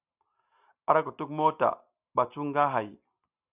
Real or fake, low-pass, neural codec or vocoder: real; 3.6 kHz; none